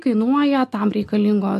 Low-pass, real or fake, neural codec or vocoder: 14.4 kHz; real; none